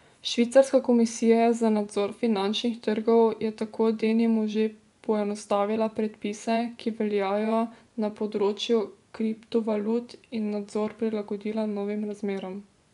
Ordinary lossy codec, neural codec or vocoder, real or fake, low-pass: none; vocoder, 24 kHz, 100 mel bands, Vocos; fake; 10.8 kHz